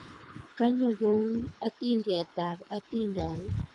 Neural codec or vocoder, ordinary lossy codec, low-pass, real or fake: codec, 24 kHz, 3 kbps, HILCodec; none; 10.8 kHz; fake